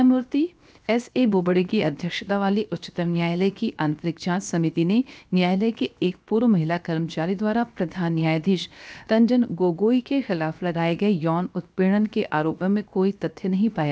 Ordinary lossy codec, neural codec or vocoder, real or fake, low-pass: none; codec, 16 kHz, 0.7 kbps, FocalCodec; fake; none